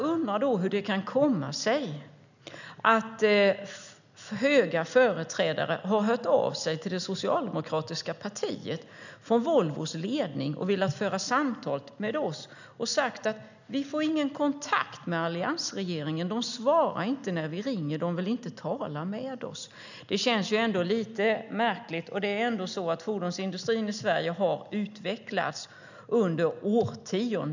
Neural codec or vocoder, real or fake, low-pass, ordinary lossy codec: none; real; 7.2 kHz; none